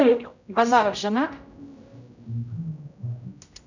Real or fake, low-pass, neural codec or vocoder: fake; 7.2 kHz; codec, 16 kHz, 0.5 kbps, X-Codec, HuBERT features, trained on general audio